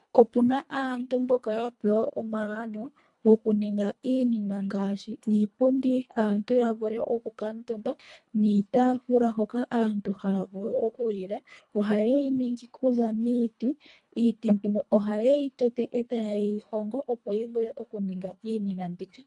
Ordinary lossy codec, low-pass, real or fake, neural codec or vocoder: MP3, 64 kbps; 10.8 kHz; fake; codec, 24 kHz, 1.5 kbps, HILCodec